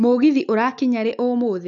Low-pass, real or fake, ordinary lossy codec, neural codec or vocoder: 7.2 kHz; real; MP3, 64 kbps; none